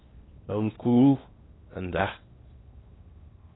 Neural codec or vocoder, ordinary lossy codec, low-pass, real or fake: codec, 16 kHz in and 24 kHz out, 0.8 kbps, FocalCodec, streaming, 65536 codes; AAC, 16 kbps; 7.2 kHz; fake